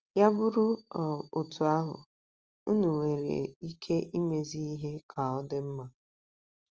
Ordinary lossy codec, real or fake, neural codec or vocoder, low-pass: Opus, 24 kbps; real; none; 7.2 kHz